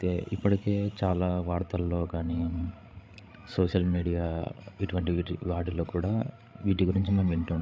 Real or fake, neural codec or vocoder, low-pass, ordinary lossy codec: fake; codec, 16 kHz, 16 kbps, FreqCodec, larger model; none; none